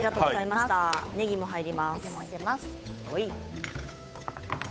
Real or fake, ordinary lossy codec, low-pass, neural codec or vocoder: fake; none; none; codec, 16 kHz, 8 kbps, FunCodec, trained on Chinese and English, 25 frames a second